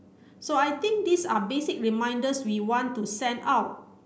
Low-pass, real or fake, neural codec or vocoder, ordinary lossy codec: none; real; none; none